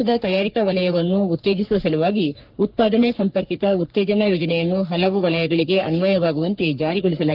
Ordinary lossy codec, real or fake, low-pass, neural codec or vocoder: Opus, 32 kbps; fake; 5.4 kHz; codec, 44.1 kHz, 3.4 kbps, Pupu-Codec